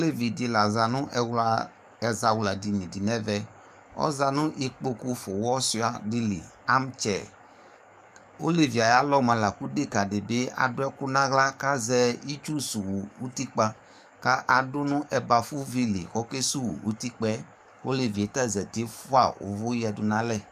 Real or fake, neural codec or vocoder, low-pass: fake; codec, 44.1 kHz, 7.8 kbps, DAC; 14.4 kHz